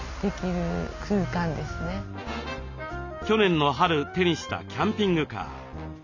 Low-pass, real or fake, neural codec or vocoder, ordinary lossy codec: 7.2 kHz; real; none; none